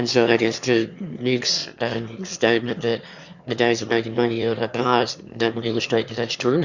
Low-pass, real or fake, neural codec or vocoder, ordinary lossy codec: 7.2 kHz; fake; autoencoder, 22.05 kHz, a latent of 192 numbers a frame, VITS, trained on one speaker; Opus, 64 kbps